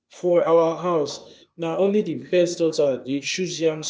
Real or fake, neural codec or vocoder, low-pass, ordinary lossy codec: fake; codec, 16 kHz, 0.8 kbps, ZipCodec; none; none